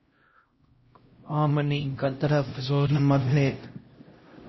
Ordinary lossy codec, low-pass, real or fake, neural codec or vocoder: MP3, 24 kbps; 7.2 kHz; fake; codec, 16 kHz, 0.5 kbps, X-Codec, HuBERT features, trained on LibriSpeech